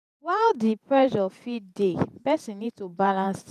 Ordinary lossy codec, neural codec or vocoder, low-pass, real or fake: none; vocoder, 48 kHz, 128 mel bands, Vocos; 14.4 kHz; fake